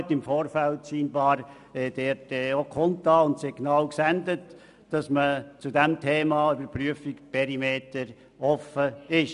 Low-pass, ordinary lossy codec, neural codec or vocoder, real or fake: 10.8 kHz; none; none; real